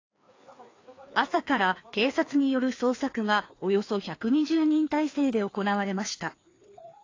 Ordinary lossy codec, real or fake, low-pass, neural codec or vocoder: AAC, 32 kbps; fake; 7.2 kHz; codec, 16 kHz, 2 kbps, FreqCodec, larger model